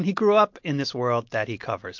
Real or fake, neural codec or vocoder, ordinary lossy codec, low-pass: real; none; MP3, 48 kbps; 7.2 kHz